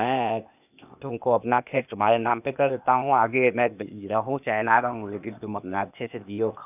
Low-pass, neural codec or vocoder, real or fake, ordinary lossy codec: 3.6 kHz; codec, 16 kHz, 0.8 kbps, ZipCodec; fake; none